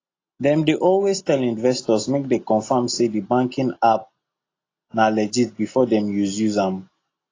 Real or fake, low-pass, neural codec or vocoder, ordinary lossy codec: real; 7.2 kHz; none; AAC, 32 kbps